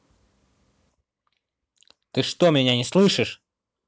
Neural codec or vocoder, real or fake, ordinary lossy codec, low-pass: none; real; none; none